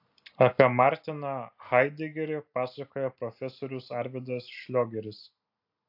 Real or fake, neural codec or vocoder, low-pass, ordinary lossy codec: real; none; 5.4 kHz; AAC, 48 kbps